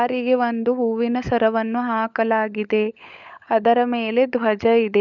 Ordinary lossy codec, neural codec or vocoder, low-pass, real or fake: none; codec, 16 kHz, 16 kbps, FunCodec, trained on LibriTTS, 50 frames a second; 7.2 kHz; fake